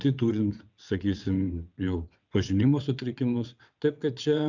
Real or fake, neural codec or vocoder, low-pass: fake; vocoder, 22.05 kHz, 80 mel bands, WaveNeXt; 7.2 kHz